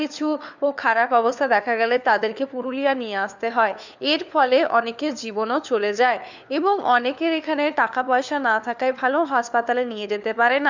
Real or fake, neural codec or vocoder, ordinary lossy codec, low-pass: fake; codec, 16 kHz, 4 kbps, FunCodec, trained on LibriTTS, 50 frames a second; none; 7.2 kHz